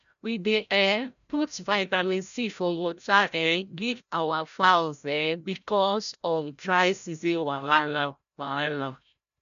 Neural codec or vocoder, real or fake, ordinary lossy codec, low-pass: codec, 16 kHz, 0.5 kbps, FreqCodec, larger model; fake; none; 7.2 kHz